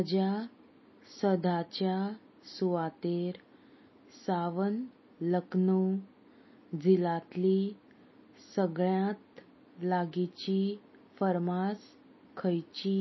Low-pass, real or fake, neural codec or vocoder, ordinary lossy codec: 7.2 kHz; real; none; MP3, 24 kbps